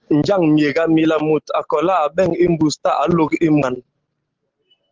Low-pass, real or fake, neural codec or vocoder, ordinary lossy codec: 7.2 kHz; real; none; Opus, 16 kbps